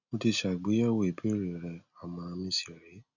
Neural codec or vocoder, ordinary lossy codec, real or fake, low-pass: none; none; real; 7.2 kHz